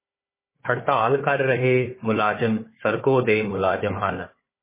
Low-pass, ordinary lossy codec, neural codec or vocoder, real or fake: 3.6 kHz; MP3, 16 kbps; codec, 16 kHz, 4 kbps, FunCodec, trained on Chinese and English, 50 frames a second; fake